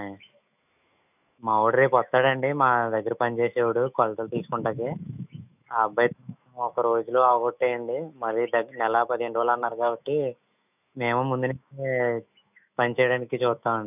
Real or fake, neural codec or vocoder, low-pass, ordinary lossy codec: fake; autoencoder, 48 kHz, 128 numbers a frame, DAC-VAE, trained on Japanese speech; 3.6 kHz; none